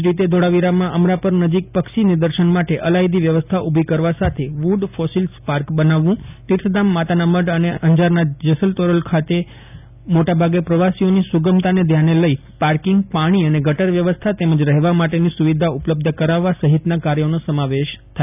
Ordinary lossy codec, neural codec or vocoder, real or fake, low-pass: none; none; real; 3.6 kHz